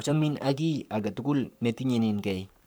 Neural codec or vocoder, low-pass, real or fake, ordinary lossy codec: codec, 44.1 kHz, 7.8 kbps, Pupu-Codec; none; fake; none